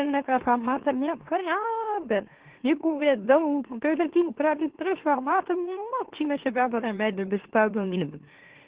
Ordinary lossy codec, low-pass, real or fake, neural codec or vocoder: Opus, 16 kbps; 3.6 kHz; fake; autoencoder, 44.1 kHz, a latent of 192 numbers a frame, MeloTTS